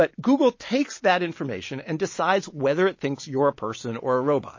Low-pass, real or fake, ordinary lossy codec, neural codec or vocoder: 7.2 kHz; real; MP3, 32 kbps; none